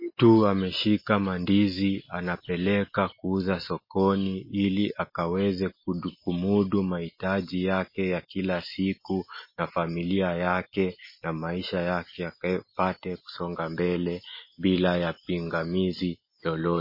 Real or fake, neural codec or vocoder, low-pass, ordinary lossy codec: real; none; 5.4 kHz; MP3, 24 kbps